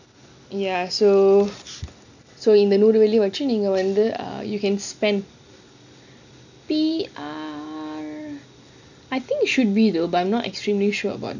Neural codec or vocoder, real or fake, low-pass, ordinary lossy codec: none; real; 7.2 kHz; none